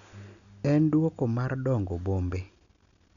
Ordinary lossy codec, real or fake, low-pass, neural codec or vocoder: none; real; 7.2 kHz; none